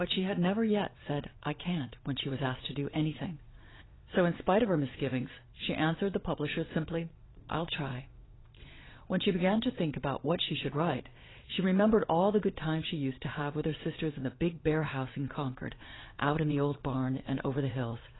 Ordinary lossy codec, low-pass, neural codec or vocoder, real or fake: AAC, 16 kbps; 7.2 kHz; vocoder, 22.05 kHz, 80 mel bands, WaveNeXt; fake